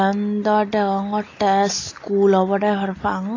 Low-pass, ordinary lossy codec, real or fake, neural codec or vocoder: 7.2 kHz; AAC, 32 kbps; real; none